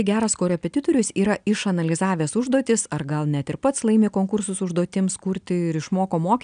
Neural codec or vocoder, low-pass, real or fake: none; 9.9 kHz; real